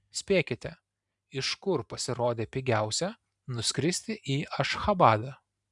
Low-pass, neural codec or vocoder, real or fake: 10.8 kHz; vocoder, 44.1 kHz, 128 mel bands every 512 samples, BigVGAN v2; fake